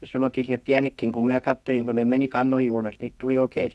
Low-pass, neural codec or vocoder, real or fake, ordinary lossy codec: none; codec, 24 kHz, 0.9 kbps, WavTokenizer, medium music audio release; fake; none